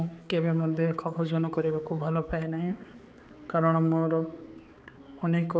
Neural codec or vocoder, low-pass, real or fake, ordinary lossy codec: codec, 16 kHz, 4 kbps, X-Codec, HuBERT features, trained on balanced general audio; none; fake; none